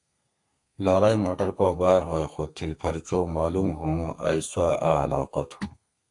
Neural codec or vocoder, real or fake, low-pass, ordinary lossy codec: codec, 32 kHz, 1.9 kbps, SNAC; fake; 10.8 kHz; AAC, 64 kbps